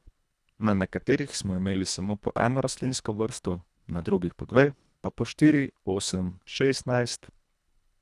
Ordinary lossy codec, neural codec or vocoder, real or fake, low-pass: none; codec, 24 kHz, 1.5 kbps, HILCodec; fake; none